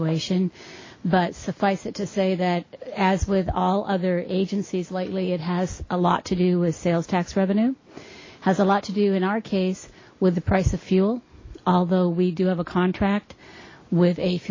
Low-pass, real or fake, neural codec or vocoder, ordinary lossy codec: 7.2 kHz; real; none; MP3, 32 kbps